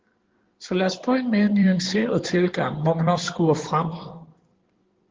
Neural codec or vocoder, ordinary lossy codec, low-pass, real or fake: codec, 16 kHz in and 24 kHz out, 2.2 kbps, FireRedTTS-2 codec; Opus, 16 kbps; 7.2 kHz; fake